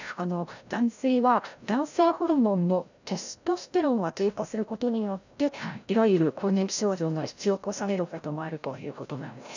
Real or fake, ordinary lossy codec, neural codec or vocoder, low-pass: fake; none; codec, 16 kHz, 0.5 kbps, FreqCodec, larger model; 7.2 kHz